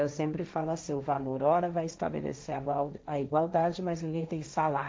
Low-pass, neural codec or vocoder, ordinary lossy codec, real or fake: none; codec, 16 kHz, 1.1 kbps, Voila-Tokenizer; none; fake